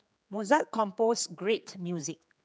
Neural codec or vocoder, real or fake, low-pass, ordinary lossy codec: codec, 16 kHz, 4 kbps, X-Codec, HuBERT features, trained on general audio; fake; none; none